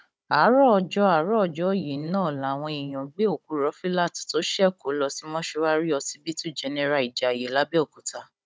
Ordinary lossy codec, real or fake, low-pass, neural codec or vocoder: none; fake; none; codec, 16 kHz, 16 kbps, FunCodec, trained on Chinese and English, 50 frames a second